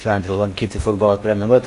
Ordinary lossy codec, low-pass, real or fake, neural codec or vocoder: AAC, 48 kbps; 10.8 kHz; fake; codec, 16 kHz in and 24 kHz out, 0.6 kbps, FocalCodec, streaming, 4096 codes